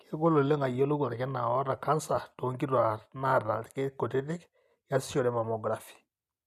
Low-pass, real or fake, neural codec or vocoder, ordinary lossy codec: 14.4 kHz; real; none; none